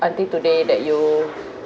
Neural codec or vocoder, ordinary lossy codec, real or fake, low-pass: none; none; real; none